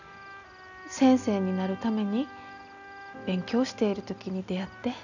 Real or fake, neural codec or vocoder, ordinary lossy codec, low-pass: real; none; none; 7.2 kHz